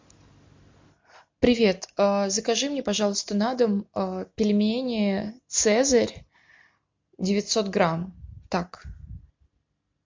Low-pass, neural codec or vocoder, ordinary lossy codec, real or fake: 7.2 kHz; none; MP3, 48 kbps; real